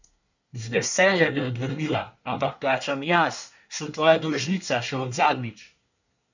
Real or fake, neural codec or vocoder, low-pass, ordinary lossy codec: fake; codec, 24 kHz, 1 kbps, SNAC; 7.2 kHz; none